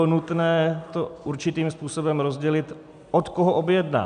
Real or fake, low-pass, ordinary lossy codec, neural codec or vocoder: real; 9.9 kHz; AAC, 96 kbps; none